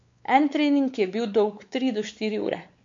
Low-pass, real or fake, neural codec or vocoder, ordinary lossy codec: 7.2 kHz; fake; codec, 16 kHz, 4 kbps, X-Codec, WavLM features, trained on Multilingual LibriSpeech; MP3, 48 kbps